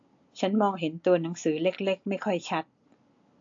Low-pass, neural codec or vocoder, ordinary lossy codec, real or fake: 7.2 kHz; none; AAC, 48 kbps; real